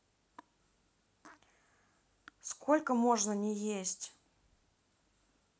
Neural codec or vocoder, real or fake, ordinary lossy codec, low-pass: none; real; none; none